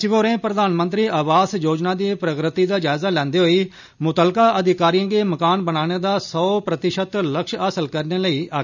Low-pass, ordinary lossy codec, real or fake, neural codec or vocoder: 7.2 kHz; none; real; none